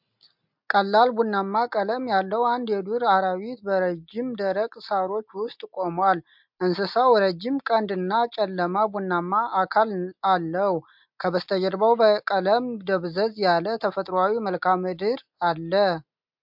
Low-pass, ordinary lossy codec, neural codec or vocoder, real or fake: 5.4 kHz; MP3, 48 kbps; none; real